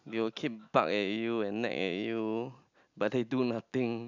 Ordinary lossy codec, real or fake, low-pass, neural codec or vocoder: none; real; 7.2 kHz; none